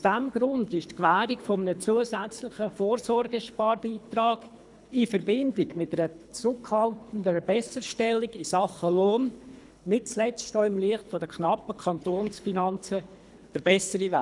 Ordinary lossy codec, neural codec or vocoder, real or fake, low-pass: none; codec, 24 kHz, 3 kbps, HILCodec; fake; 10.8 kHz